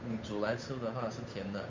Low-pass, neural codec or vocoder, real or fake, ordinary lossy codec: 7.2 kHz; codec, 16 kHz, 8 kbps, FunCodec, trained on Chinese and English, 25 frames a second; fake; MP3, 64 kbps